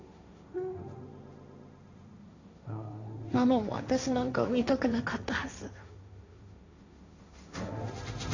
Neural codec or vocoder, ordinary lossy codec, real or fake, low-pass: codec, 16 kHz, 1.1 kbps, Voila-Tokenizer; AAC, 48 kbps; fake; 7.2 kHz